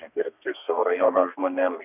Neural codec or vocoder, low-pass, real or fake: codec, 32 kHz, 1.9 kbps, SNAC; 3.6 kHz; fake